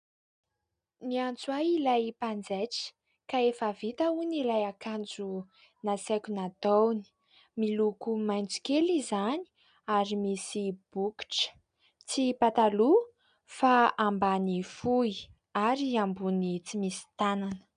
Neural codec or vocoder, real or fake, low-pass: none; real; 9.9 kHz